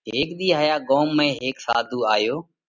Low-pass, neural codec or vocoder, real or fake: 7.2 kHz; none; real